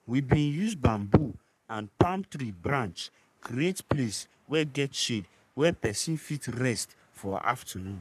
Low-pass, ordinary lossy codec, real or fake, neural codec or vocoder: 14.4 kHz; none; fake; codec, 44.1 kHz, 3.4 kbps, Pupu-Codec